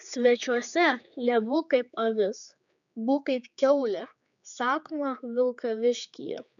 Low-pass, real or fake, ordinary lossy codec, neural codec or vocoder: 7.2 kHz; fake; MP3, 96 kbps; codec, 16 kHz, 4 kbps, X-Codec, HuBERT features, trained on general audio